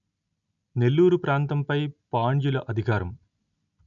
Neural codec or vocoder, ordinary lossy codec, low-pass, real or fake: none; none; 7.2 kHz; real